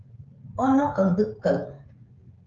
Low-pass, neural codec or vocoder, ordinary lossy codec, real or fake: 7.2 kHz; codec, 16 kHz, 16 kbps, FreqCodec, smaller model; Opus, 32 kbps; fake